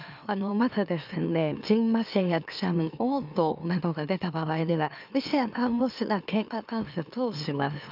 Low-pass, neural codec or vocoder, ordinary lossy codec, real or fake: 5.4 kHz; autoencoder, 44.1 kHz, a latent of 192 numbers a frame, MeloTTS; none; fake